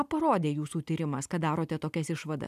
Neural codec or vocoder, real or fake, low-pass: none; real; 14.4 kHz